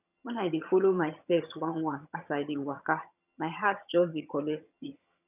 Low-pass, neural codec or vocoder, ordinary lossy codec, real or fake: 3.6 kHz; vocoder, 22.05 kHz, 80 mel bands, HiFi-GAN; none; fake